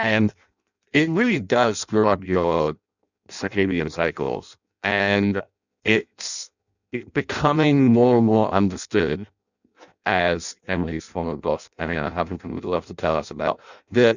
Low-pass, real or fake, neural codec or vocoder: 7.2 kHz; fake; codec, 16 kHz in and 24 kHz out, 0.6 kbps, FireRedTTS-2 codec